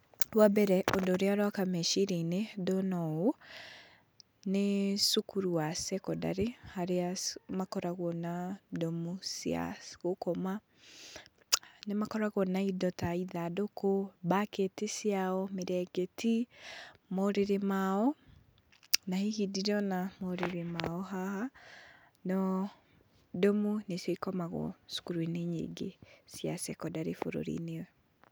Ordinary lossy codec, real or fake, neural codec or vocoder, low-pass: none; real; none; none